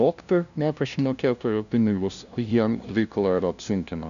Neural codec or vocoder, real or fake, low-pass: codec, 16 kHz, 0.5 kbps, FunCodec, trained on LibriTTS, 25 frames a second; fake; 7.2 kHz